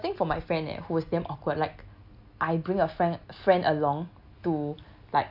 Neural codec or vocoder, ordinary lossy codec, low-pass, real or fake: none; AAC, 48 kbps; 5.4 kHz; real